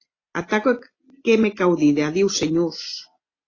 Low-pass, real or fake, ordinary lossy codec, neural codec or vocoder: 7.2 kHz; real; AAC, 32 kbps; none